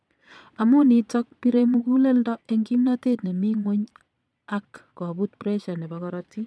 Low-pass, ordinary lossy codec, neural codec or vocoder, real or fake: none; none; vocoder, 22.05 kHz, 80 mel bands, Vocos; fake